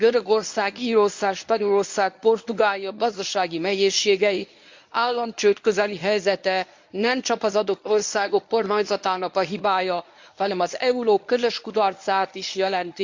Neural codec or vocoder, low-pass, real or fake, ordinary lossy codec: codec, 24 kHz, 0.9 kbps, WavTokenizer, medium speech release version 1; 7.2 kHz; fake; MP3, 64 kbps